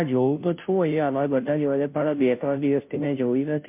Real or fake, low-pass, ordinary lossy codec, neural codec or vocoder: fake; 3.6 kHz; MP3, 32 kbps; codec, 16 kHz, 0.5 kbps, FunCodec, trained on Chinese and English, 25 frames a second